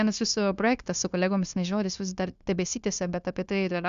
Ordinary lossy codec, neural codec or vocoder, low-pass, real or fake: Opus, 64 kbps; codec, 16 kHz, 0.9 kbps, LongCat-Audio-Codec; 7.2 kHz; fake